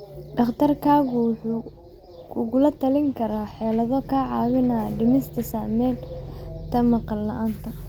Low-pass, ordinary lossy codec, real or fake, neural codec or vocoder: 19.8 kHz; Opus, 32 kbps; real; none